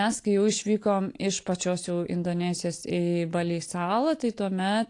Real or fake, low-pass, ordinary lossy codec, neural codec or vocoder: real; 10.8 kHz; AAC, 48 kbps; none